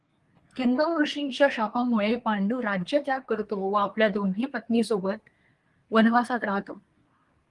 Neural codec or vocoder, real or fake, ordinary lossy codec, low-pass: codec, 24 kHz, 1 kbps, SNAC; fake; Opus, 24 kbps; 10.8 kHz